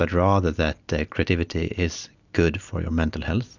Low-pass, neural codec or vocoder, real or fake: 7.2 kHz; none; real